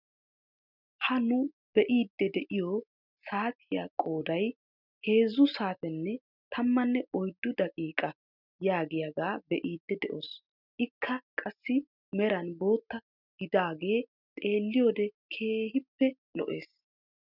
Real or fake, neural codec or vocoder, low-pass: real; none; 5.4 kHz